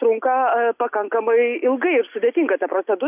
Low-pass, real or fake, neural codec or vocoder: 3.6 kHz; real; none